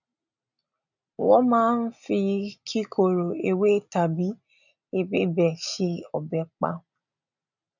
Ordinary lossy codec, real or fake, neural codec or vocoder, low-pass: none; real; none; 7.2 kHz